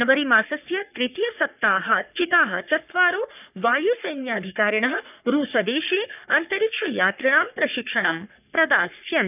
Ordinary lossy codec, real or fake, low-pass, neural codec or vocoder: none; fake; 3.6 kHz; codec, 44.1 kHz, 3.4 kbps, Pupu-Codec